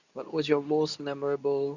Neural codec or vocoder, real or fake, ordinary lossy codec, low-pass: codec, 24 kHz, 0.9 kbps, WavTokenizer, medium speech release version 2; fake; none; 7.2 kHz